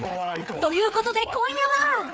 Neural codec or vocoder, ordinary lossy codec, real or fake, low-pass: codec, 16 kHz, 4 kbps, FreqCodec, larger model; none; fake; none